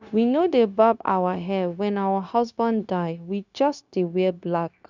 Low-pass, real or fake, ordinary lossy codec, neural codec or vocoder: 7.2 kHz; fake; none; codec, 16 kHz, 0.9 kbps, LongCat-Audio-Codec